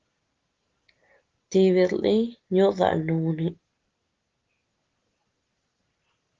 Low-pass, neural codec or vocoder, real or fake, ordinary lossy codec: 7.2 kHz; none; real; Opus, 16 kbps